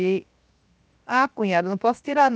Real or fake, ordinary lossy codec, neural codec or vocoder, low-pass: fake; none; codec, 16 kHz, 0.7 kbps, FocalCodec; none